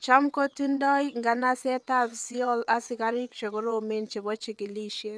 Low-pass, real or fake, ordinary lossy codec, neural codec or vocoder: none; fake; none; vocoder, 22.05 kHz, 80 mel bands, WaveNeXt